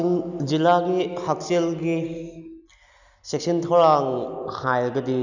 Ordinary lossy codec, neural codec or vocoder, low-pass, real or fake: none; none; 7.2 kHz; real